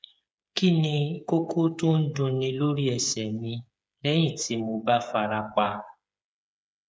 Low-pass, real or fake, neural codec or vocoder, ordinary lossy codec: none; fake; codec, 16 kHz, 8 kbps, FreqCodec, smaller model; none